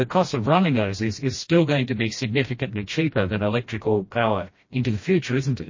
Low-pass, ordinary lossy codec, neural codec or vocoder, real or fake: 7.2 kHz; MP3, 32 kbps; codec, 16 kHz, 1 kbps, FreqCodec, smaller model; fake